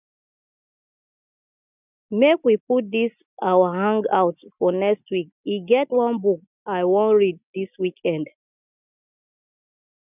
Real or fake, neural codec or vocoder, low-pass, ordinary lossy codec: real; none; 3.6 kHz; none